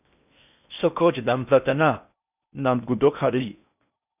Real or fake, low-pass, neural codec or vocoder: fake; 3.6 kHz; codec, 16 kHz in and 24 kHz out, 0.6 kbps, FocalCodec, streaming, 4096 codes